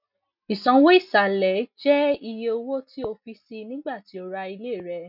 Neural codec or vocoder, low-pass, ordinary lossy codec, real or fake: none; 5.4 kHz; none; real